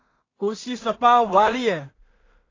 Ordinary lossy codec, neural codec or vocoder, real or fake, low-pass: AAC, 32 kbps; codec, 16 kHz in and 24 kHz out, 0.4 kbps, LongCat-Audio-Codec, two codebook decoder; fake; 7.2 kHz